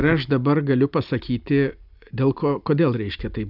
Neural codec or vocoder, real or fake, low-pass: none; real; 5.4 kHz